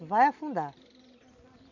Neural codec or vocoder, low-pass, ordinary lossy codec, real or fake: vocoder, 44.1 kHz, 128 mel bands every 256 samples, BigVGAN v2; 7.2 kHz; none; fake